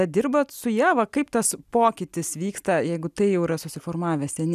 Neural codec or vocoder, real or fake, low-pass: none; real; 14.4 kHz